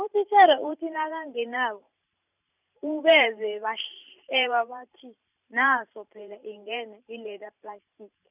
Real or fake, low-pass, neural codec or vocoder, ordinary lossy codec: fake; 3.6 kHz; vocoder, 44.1 kHz, 128 mel bands every 512 samples, BigVGAN v2; none